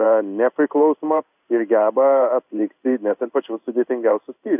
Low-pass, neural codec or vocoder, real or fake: 3.6 kHz; codec, 16 kHz in and 24 kHz out, 1 kbps, XY-Tokenizer; fake